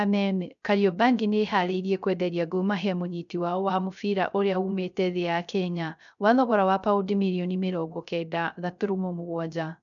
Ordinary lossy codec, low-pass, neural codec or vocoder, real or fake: none; 7.2 kHz; codec, 16 kHz, 0.3 kbps, FocalCodec; fake